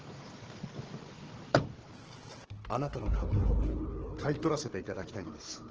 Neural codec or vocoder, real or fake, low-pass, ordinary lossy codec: codec, 16 kHz, 4 kbps, FunCodec, trained on Chinese and English, 50 frames a second; fake; 7.2 kHz; Opus, 16 kbps